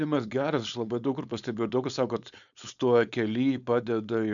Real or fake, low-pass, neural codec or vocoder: fake; 7.2 kHz; codec, 16 kHz, 4.8 kbps, FACodec